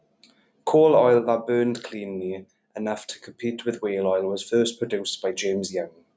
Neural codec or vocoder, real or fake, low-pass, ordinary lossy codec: none; real; none; none